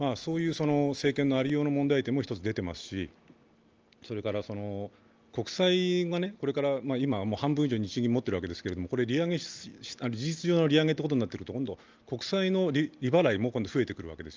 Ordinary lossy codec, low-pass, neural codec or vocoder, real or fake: Opus, 24 kbps; 7.2 kHz; none; real